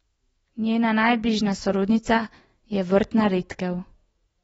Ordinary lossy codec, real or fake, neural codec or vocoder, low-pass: AAC, 24 kbps; fake; autoencoder, 48 kHz, 128 numbers a frame, DAC-VAE, trained on Japanese speech; 19.8 kHz